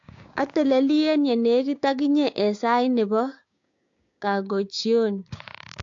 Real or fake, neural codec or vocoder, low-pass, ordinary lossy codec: fake; codec, 16 kHz, 6 kbps, DAC; 7.2 kHz; AAC, 48 kbps